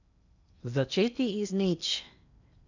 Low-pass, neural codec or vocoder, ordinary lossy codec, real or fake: 7.2 kHz; codec, 16 kHz in and 24 kHz out, 0.8 kbps, FocalCodec, streaming, 65536 codes; none; fake